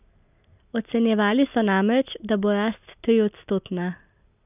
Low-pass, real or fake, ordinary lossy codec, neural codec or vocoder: 3.6 kHz; real; none; none